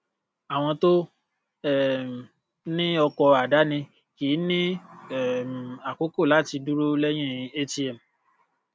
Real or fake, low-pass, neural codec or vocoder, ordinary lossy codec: real; none; none; none